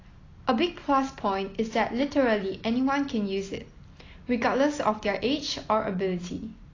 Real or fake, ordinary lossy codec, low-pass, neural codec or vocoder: real; AAC, 32 kbps; 7.2 kHz; none